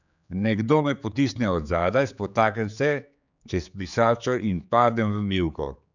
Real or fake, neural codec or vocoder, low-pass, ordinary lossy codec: fake; codec, 16 kHz, 4 kbps, X-Codec, HuBERT features, trained on general audio; 7.2 kHz; none